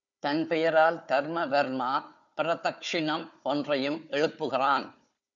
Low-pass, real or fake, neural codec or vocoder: 7.2 kHz; fake; codec, 16 kHz, 4 kbps, FunCodec, trained on Chinese and English, 50 frames a second